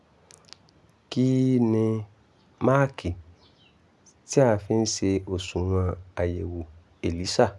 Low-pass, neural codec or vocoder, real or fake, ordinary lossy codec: none; none; real; none